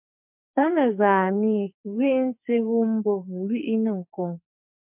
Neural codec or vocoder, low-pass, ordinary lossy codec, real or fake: codec, 44.1 kHz, 2.6 kbps, SNAC; 3.6 kHz; MP3, 32 kbps; fake